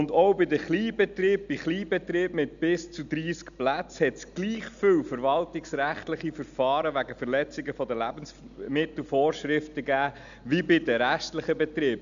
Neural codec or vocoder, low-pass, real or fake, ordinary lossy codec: none; 7.2 kHz; real; none